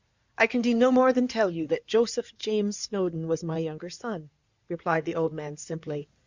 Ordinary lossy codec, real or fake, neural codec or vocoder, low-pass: Opus, 64 kbps; fake; codec, 16 kHz in and 24 kHz out, 2.2 kbps, FireRedTTS-2 codec; 7.2 kHz